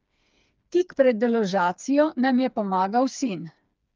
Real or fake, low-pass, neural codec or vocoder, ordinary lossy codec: fake; 7.2 kHz; codec, 16 kHz, 4 kbps, FreqCodec, smaller model; Opus, 24 kbps